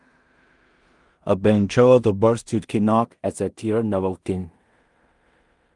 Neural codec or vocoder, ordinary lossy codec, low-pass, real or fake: codec, 16 kHz in and 24 kHz out, 0.4 kbps, LongCat-Audio-Codec, two codebook decoder; Opus, 32 kbps; 10.8 kHz; fake